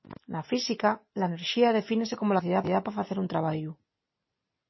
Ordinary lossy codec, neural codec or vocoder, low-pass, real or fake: MP3, 24 kbps; none; 7.2 kHz; real